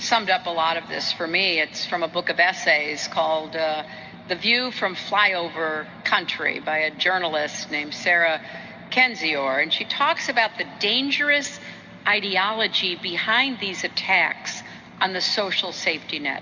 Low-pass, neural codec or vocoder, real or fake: 7.2 kHz; none; real